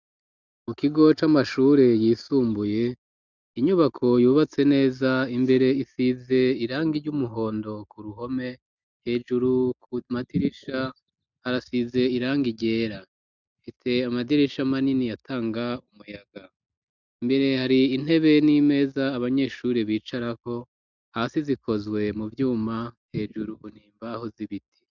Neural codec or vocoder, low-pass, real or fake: none; 7.2 kHz; real